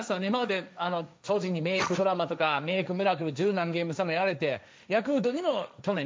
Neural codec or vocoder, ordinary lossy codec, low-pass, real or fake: codec, 16 kHz, 1.1 kbps, Voila-Tokenizer; none; none; fake